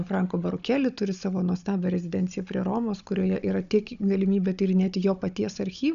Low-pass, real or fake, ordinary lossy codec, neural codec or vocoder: 7.2 kHz; fake; MP3, 96 kbps; codec, 16 kHz, 16 kbps, FunCodec, trained on Chinese and English, 50 frames a second